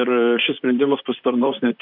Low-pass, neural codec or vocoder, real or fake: 5.4 kHz; vocoder, 44.1 kHz, 128 mel bands, Pupu-Vocoder; fake